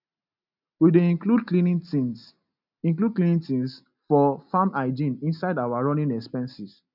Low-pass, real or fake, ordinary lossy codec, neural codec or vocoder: 5.4 kHz; real; none; none